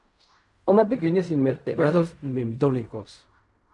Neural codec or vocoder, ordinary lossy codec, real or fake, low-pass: codec, 16 kHz in and 24 kHz out, 0.4 kbps, LongCat-Audio-Codec, fine tuned four codebook decoder; MP3, 64 kbps; fake; 10.8 kHz